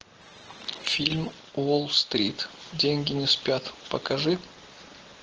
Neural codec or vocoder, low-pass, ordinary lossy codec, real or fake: none; 7.2 kHz; Opus, 16 kbps; real